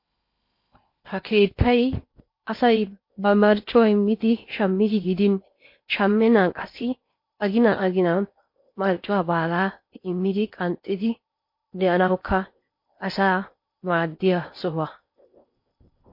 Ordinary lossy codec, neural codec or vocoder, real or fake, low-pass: MP3, 32 kbps; codec, 16 kHz in and 24 kHz out, 0.8 kbps, FocalCodec, streaming, 65536 codes; fake; 5.4 kHz